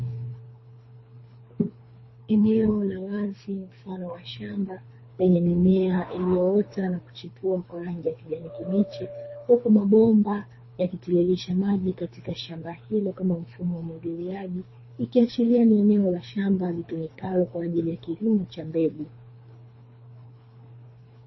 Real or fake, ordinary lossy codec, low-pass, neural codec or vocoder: fake; MP3, 24 kbps; 7.2 kHz; codec, 24 kHz, 3 kbps, HILCodec